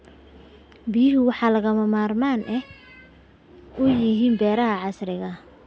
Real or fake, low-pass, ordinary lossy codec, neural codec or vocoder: real; none; none; none